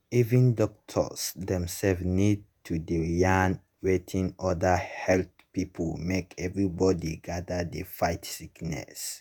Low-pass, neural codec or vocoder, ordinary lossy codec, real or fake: none; vocoder, 48 kHz, 128 mel bands, Vocos; none; fake